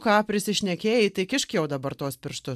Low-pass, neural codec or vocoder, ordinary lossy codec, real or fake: 14.4 kHz; none; AAC, 96 kbps; real